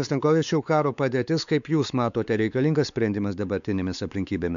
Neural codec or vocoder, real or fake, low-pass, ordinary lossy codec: codec, 16 kHz, 4 kbps, X-Codec, WavLM features, trained on Multilingual LibriSpeech; fake; 7.2 kHz; MP3, 96 kbps